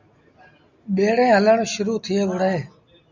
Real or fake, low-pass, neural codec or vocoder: real; 7.2 kHz; none